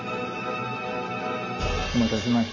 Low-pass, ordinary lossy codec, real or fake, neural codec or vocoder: 7.2 kHz; none; real; none